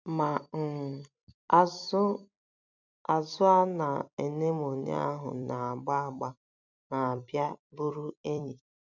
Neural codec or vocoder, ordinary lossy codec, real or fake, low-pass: vocoder, 44.1 kHz, 128 mel bands every 256 samples, BigVGAN v2; none; fake; 7.2 kHz